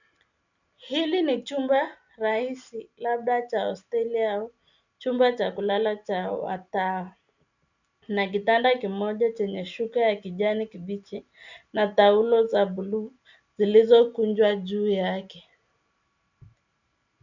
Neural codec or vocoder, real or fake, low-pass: none; real; 7.2 kHz